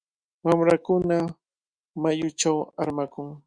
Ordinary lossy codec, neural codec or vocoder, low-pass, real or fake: Opus, 64 kbps; autoencoder, 48 kHz, 128 numbers a frame, DAC-VAE, trained on Japanese speech; 9.9 kHz; fake